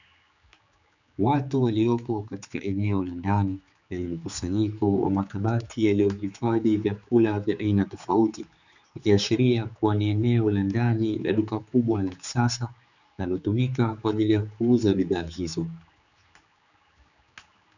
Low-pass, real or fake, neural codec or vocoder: 7.2 kHz; fake; codec, 16 kHz, 4 kbps, X-Codec, HuBERT features, trained on general audio